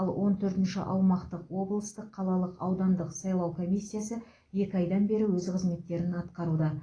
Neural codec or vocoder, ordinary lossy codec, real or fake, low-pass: none; AAC, 32 kbps; real; 9.9 kHz